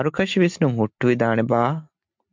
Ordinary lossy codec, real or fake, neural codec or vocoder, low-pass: MP3, 64 kbps; real; none; 7.2 kHz